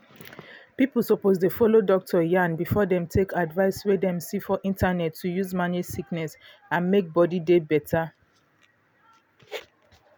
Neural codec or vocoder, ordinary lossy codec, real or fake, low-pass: none; none; real; none